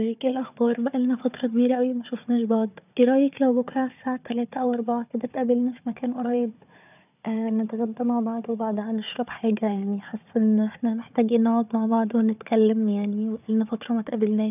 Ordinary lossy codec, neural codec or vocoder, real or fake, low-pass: none; codec, 16 kHz, 4 kbps, FunCodec, trained on Chinese and English, 50 frames a second; fake; 3.6 kHz